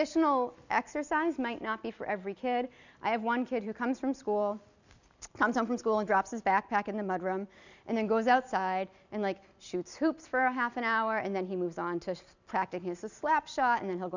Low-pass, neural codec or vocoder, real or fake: 7.2 kHz; none; real